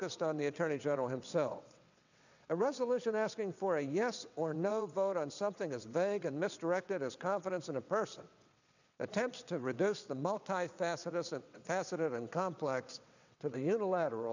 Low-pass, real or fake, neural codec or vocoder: 7.2 kHz; fake; vocoder, 22.05 kHz, 80 mel bands, WaveNeXt